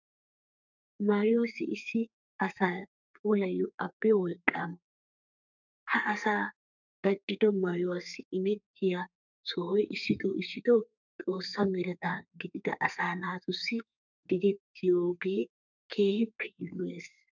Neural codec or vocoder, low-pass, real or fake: codec, 32 kHz, 1.9 kbps, SNAC; 7.2 kHz; fake